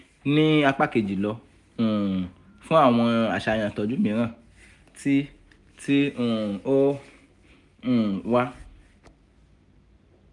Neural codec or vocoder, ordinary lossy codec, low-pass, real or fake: none; none; 10.8 kHz; real